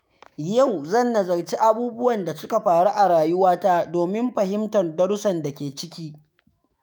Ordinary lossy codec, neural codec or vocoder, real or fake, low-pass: none; autoencoder, 48 kHz, 128 numbers a frame, DAC-VAE, trained on Japanese speech; fake; none